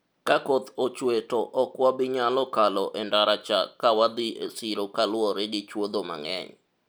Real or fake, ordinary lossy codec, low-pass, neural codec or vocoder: real; none; none; none